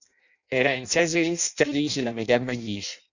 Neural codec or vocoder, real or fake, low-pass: codec, 16 kHz in and 24 kHz out, 0.6 kbps, FireRedTTS-2 codec; fake; 7.2 kHz